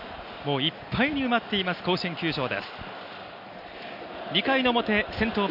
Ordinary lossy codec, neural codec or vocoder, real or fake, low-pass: none; none; real; 5.4 kHz